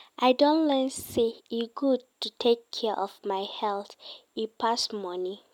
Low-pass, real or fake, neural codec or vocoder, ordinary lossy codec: 19.8 kHz; real; none; MP3, 96 kbps